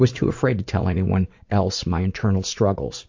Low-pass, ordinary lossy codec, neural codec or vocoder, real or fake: 7.2 kHz; MP3, 48 kbps; vocoder, 44.1 kHz, 80 mel bands, Vocos; fake